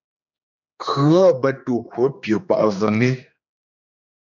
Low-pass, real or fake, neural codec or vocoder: 7.2 kHz; fake; codec, 16 kHz, 2 kbps, X-Codec, HuBERT features, trained on general audio